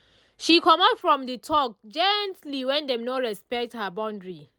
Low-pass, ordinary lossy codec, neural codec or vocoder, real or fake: none; none; none; real